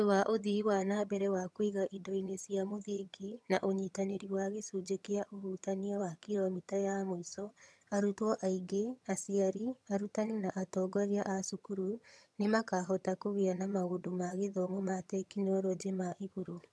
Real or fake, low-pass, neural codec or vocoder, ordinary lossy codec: fake; none; vocoder, 22.05 kHz, 80 mel bands, HiFi-GAN; none